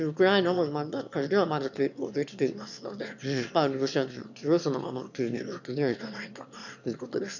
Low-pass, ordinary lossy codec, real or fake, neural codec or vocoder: 7.2 kHz; none; fake; autoencoder, 22.05 kHz, a latent of 192 numbers a frame, VITS, trained on one speaker